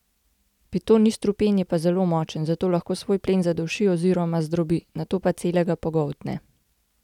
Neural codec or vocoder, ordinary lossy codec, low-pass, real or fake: none; none; 19.8 kHz; real